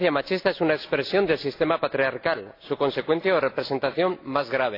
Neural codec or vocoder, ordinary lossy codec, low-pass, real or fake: none; AAC, 32 kbps; 5.4 kHz; real